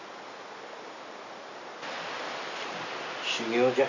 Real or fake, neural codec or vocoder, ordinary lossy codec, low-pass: real; none; none; 7.2 kHz